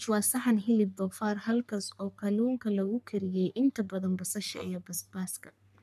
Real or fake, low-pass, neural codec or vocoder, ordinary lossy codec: fake; 14.4 kHz; codec, 44.1 kHz, 3.4 kbps, Pupu-Codec; none